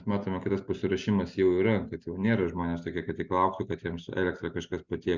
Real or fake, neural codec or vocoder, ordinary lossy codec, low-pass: real; none; Opus, 64 kbps; 7.2 kHz